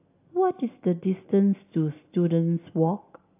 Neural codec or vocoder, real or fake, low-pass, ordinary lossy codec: none; real; 3.6 kHz; AAC, 24 kbps